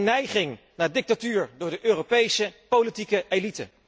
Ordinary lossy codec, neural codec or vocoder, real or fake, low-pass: none; none; real; none